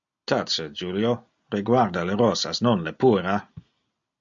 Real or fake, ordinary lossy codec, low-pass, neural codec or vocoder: real; MP3, 48 kbps; 7.2 kHz; none